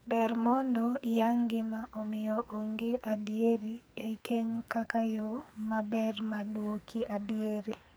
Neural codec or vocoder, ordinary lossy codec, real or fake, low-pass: codec, 44.1 kHz, 2.6 kbps, SNAC; none; fake; none